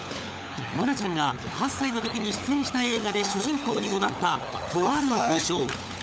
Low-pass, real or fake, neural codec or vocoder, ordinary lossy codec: none; fake; codec, 16 kHz, 16 kbps, FunCodec, trained on LibriTTS, 50 frames a second; none